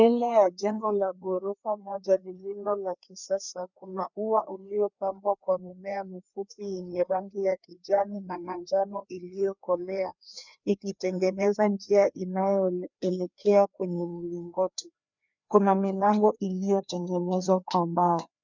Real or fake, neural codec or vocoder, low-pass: fake; codec, 16 kHz, 2 kbps, FreqCodec, larger model; 7.2 kHz